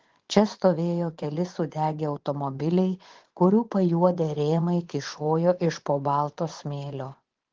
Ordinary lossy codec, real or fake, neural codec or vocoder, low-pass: Opus, 16 kbps; fake; vocoder, 24 kHz, 100 mel bands, Vocos; 7.2 kHz